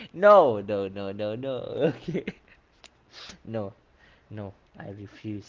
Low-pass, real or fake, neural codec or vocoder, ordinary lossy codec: 7.2 kHz; real; none; Opus, 16 kbps